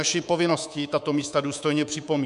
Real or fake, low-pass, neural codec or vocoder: fake; 10.8 kHz; codec, 24 kHz, 3.1 kbps, DualCodec